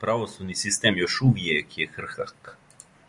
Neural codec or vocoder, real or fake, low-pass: none; real; 10.8 kHz